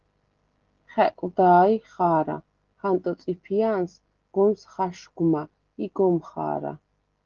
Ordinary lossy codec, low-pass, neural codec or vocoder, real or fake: Opus, 16 kbps; 7.2 kHz; none; real